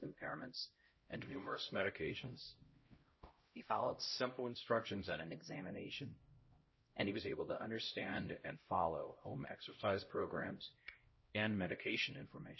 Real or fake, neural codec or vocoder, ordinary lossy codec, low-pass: fake; codec, 16 kHz, 0.5 kbps, X-Codec, HuBERT features, trained on LibriSpeech; MP3, 24 kbps; 7.2 kHz